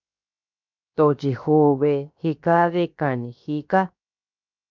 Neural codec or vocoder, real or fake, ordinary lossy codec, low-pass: codec, 16 kHz, 0.7 kbps, FocalCodec; fake; MP3, 64 kbps; 7.2 kHz